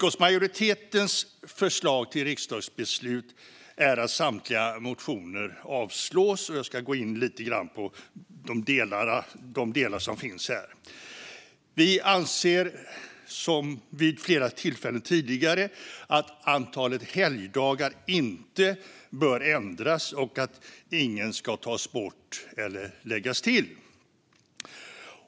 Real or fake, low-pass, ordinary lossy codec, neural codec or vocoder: real; none; none; none